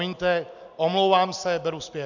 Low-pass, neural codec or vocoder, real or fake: 7.2 kHz; none; real